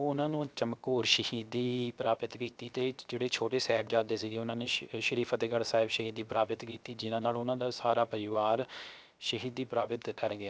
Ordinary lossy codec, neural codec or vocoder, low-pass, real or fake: none; codec, 16 kHz, 0.7 kbps, FocalCodec; none; fake